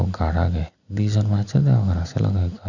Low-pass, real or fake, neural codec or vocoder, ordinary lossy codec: 7.2 kHz; real; none; none